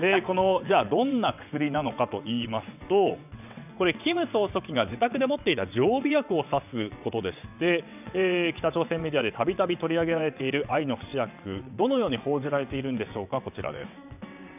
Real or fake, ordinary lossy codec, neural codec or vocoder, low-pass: fake; none; vocoder, 22.05 kHz, 80 mel bands, WaveNeXt; 3.6 kHz